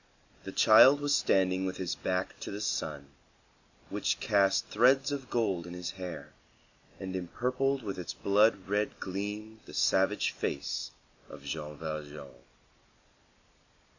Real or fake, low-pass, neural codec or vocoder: real; 7.2 kHz; none